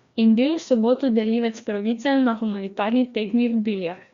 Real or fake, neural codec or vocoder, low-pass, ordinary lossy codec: fake; codec, 16 kHz, 1 kbps, FreqCodec, larger model; 7.2 kHz; Opus, 64 kbps